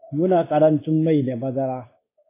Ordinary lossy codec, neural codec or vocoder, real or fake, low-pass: AAC, 24 kbps; codec, 16 kHz, 0.9 kbps, LongCat-Audio-Codec; fake; 3.6 kHz